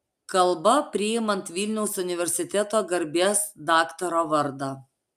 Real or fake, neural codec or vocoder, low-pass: real; none; 14.4 kHz